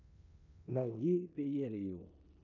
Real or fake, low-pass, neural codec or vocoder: fake; 7.2 kHz; codec, 16 kHz in and 24 kHz out, 0.9 kbps, LongCat-Audio-Codec, four codebook decoder